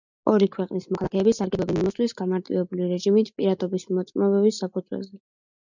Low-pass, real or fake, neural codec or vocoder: 7.2 kHz; real; none